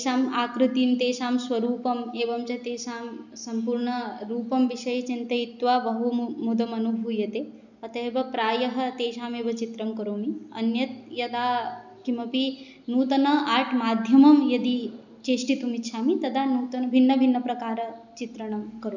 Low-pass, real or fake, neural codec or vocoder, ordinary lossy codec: 7.2 kHz; real; none; none